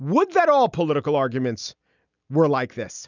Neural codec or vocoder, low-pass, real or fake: none; 7.2 kHz; real